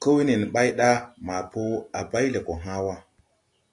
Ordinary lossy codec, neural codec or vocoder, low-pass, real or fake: AAC, 48 kbps; none; 10.8 kHz; real